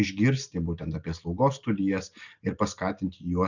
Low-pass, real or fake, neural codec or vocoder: 7.2 kHz; real; none